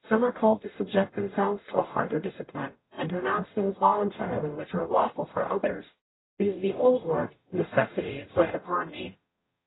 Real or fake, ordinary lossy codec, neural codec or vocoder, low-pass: fake; AAC, 16 kbps; codec, 44.1 kHz, 0.9 kbps, DAC; 7.2 kHz